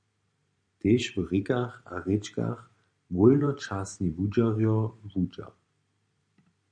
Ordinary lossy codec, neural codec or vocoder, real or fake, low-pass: MP3, 96 kbps; vocoder, 44.1 kHz, 128 mel bands every 512 samples, BigVGAN v2; fake; 9.9 kHz